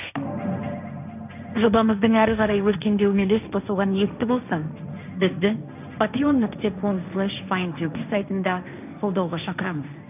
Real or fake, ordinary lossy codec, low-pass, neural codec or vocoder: fake; none; 3.6 kHz; codec, 16 kHz, 1.1 kbps, Voila-Tokenizer